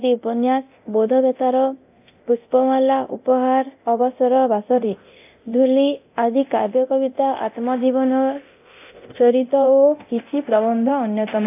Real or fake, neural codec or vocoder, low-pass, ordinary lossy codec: fake; codec, 24 kHz, 0.9 kbps, DualCodec; 3.6 kHz; AAC, 32 kbps